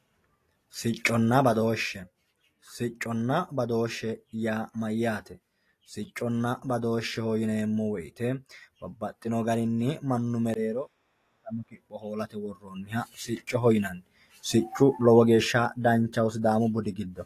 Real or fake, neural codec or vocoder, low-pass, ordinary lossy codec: real; none; 14.4 kHz; AAC, 48 kbps